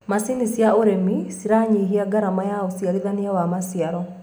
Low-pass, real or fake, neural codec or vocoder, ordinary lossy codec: none; real; none; none